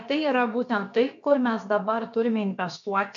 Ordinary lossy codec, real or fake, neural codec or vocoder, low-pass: AAC, 64 kbps; fake; codec, 16 kHz, about 1 kbps, DyCAST, with the encoder's durations; 7.2 kHz